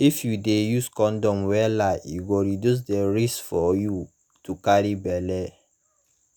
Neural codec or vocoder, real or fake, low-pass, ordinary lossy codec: none; real; none; none